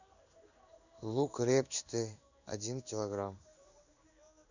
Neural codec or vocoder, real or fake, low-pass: codec, 16 kHz in and 24 kHz out, 1 kbps, XY-Tokenizer; fake; 7.2 kHz